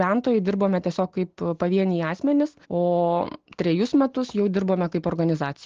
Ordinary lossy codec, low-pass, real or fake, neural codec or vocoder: Opus, 16 kbps; 7.2 kHz; real; none